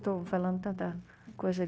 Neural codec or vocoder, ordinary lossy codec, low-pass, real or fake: codec, 16 kHz, 0.9 kbps, LongCat-Audio-Codec; none; none; fake